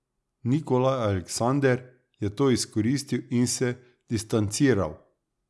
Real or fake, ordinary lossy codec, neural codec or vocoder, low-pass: real; none; none; none